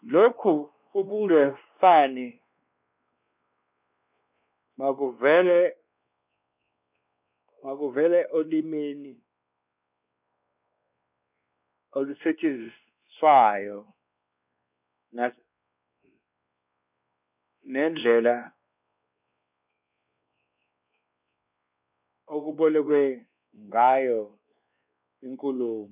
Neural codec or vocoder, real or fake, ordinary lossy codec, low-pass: codec, 16 kHz, 2 kbps, X-Codec, WavLM features, trained on Multilingual LibriSpeech; fake; none; 3.6 kHz